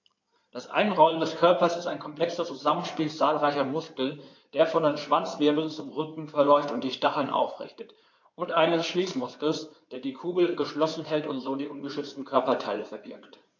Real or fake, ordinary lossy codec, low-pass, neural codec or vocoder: fake; AAC, 48 kbps; 7.2 kHz; codec, 16 kHz in and 24 kHz out, 2.2 kbps, FireRedTTS-2 codec